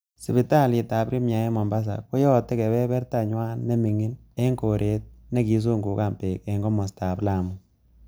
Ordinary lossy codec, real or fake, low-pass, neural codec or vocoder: none; real; none; none